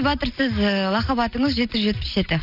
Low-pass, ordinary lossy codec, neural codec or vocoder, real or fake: 5.4 kHz; none; none; real